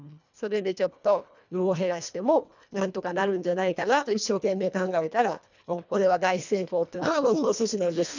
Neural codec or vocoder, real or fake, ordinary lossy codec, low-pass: codec, 24 kHz, 1.5 kbps, HILCodec; fake; none; 7.2 kHz